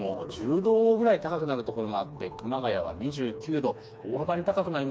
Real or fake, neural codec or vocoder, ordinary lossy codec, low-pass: fake; codec, 16 kHz, 2 kbps, FreqCodec, smaller model; none; none